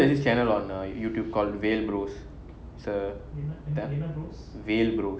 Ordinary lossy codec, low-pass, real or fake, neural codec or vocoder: none; none; real; none